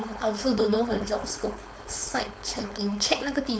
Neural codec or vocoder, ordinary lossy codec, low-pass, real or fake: codec, 16 kHz, 4.8 kbps, FACodec; none; none; fake